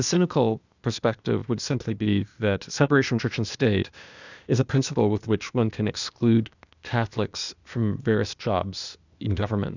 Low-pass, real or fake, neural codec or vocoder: 7.2 kHz; fake; codec, 16 kHz, 0.8 kbps, ZipCodec